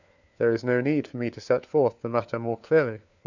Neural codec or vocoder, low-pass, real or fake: codec, 16 kHz, 4 kbps, FunCodec, trained on LibriTTS, 50 frames a second; 7.2 kHz; fake